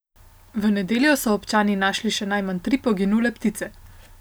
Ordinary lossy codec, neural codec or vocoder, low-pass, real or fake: none; none; none; real